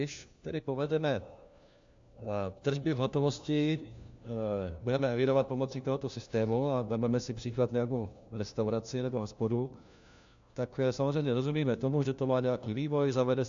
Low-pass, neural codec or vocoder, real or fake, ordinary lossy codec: 7.2 kHz; codec, 16 kHz, 1 kbps, FunCodec, trained on LibriTTS, 50 frames a second; fake; AAC, 64 kbps